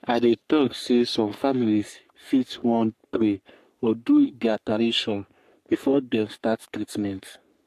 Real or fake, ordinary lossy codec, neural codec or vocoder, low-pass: fake; AAC, 64 kbps; codec, 44.1 kHz, 3.4 kbps, Pupu-Codec; 14.4 kHz